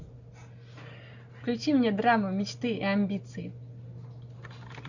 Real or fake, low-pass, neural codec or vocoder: real; 7.2 kHz; none